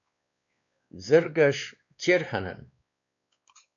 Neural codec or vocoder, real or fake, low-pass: codec, 16 kHz, 2 kbps, X-Codec, WavLM features, trained on Multilingual LibriSpeech; fake; 7.2 kHz